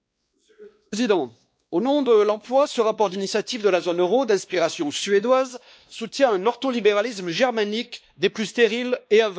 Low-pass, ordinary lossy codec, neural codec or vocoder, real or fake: none; none; codec, 16 kHz, 2 kbps, X-Codec, WavLM features, trained on Multilingual LibriSpeech; fake